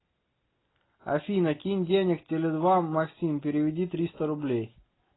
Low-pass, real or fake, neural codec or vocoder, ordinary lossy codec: 7.2 kHz; real; none; AAC, 16 kbps